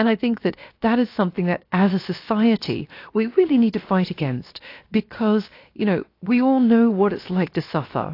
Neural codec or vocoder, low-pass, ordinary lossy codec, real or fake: codec, 16 kHz, 0.7 kbps, FocalCodec; 5.4 kHz; AAC, 32 kbps; fake